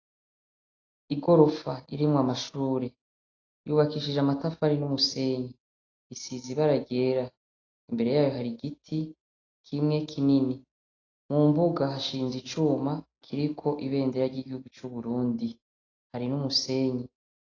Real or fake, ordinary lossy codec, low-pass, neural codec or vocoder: real; AAC, 32 kbps; 7.2 kHz; none